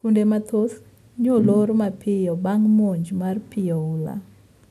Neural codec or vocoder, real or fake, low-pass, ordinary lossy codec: none; real; 14.4 kHz; none